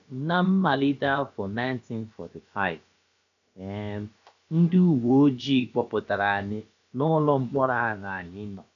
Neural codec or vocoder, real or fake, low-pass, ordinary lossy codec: codec, 16 kHz, about 1 kbps, DyCAST, with the encoder's durations; fake; 7.2 kHz; none